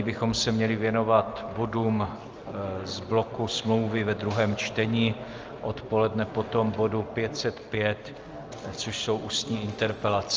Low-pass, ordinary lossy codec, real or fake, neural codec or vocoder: 7.2 kHz; Opus, 24 kbps; real; none